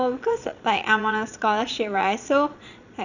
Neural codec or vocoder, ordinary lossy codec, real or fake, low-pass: vocoder, 22.05 kHz, 80 mel bands, Vocos; none; fake; 7.2 kHz